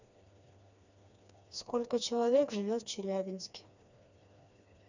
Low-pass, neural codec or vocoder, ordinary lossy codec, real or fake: 7.2 kHz; codec, 16 kHz, 2 kbps, FreqCodec, smaller model; none; fake